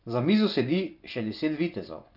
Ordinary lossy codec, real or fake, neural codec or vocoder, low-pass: none; fake; vocoder, 24 kHz, 100 mel bands, Vocos; 5.4 kHz